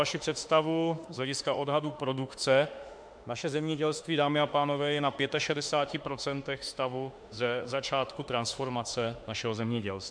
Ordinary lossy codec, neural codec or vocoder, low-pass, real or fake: MP3, 64 kbps; autoencoder, 48 kHz, 32 numbers a frame, DAC-VAE, trained on Japanese speech; 9.9 kHz; fake